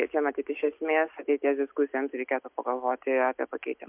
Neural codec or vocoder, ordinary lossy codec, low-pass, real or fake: none; MP3, 32 kbps; 3.6 kHz; real